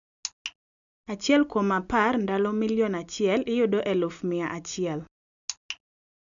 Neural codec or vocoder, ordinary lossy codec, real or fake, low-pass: none; none; real; 7.2 kHz